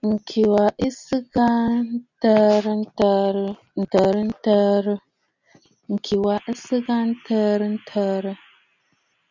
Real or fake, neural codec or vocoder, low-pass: real; none; 7.2 kHz